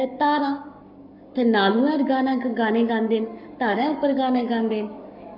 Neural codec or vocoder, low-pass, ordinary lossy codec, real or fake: codec, 44.1 kHz, 7.8 kbps, Pupu-Codec; 5.4 kHz; none; fake